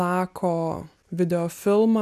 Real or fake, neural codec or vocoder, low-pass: real; none; 14.4 kHz